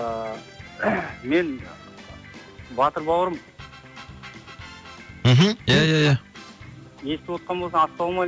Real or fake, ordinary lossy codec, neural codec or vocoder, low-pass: real; none; none; none